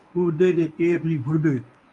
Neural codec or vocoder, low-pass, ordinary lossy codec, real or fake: codec, 24 kHz, 0.9 kbps, WavTokenizer, medium speech release version 1; 10.8 kHz; AAC, 64 kbps; fake